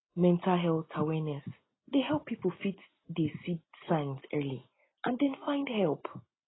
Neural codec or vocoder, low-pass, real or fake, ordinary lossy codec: none; 7.2 kHz; real; AAC, 16 kbps